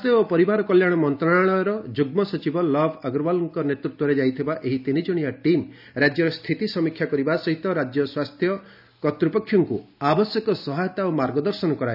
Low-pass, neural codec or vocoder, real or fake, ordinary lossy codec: 5.4 kHz; none; real; none